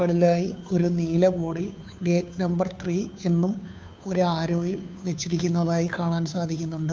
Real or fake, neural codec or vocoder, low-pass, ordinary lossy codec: fake; codec, 16 kHz, 2 kbps, FunCodec, trained on Chinese and English, 25 frames a second; none; none